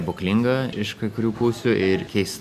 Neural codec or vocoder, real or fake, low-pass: none; real; 14.4 kHz